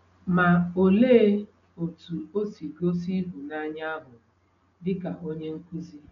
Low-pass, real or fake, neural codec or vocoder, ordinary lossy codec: 7.2 kHz; real; none; MP3, 96 kbps